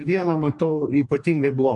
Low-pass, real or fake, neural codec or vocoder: 10.8 kHz; fake; codec, 44.1 kHz, 2.6 kbps, DAC